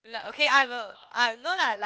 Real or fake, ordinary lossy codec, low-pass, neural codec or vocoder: fake; none; none; codec, 16 kHz, 0.8 kbps, ZipCodec